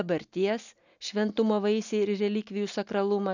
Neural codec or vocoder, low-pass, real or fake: none; 7.2 kHz; real